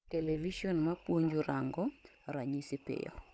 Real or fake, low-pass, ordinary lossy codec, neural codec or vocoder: fake; none; none; codec, 16 kHz, 16 kbps, FunCodec, trained on Chinese and English, 50 frames a second